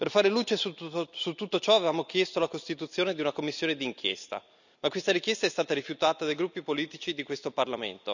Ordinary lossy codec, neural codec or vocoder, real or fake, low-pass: none; none; real; 7.2 kHz